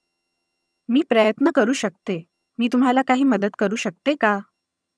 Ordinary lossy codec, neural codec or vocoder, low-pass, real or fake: none; vocoder, 22.05 kHz, 80 mel bands, HiFi-GAN; none; fake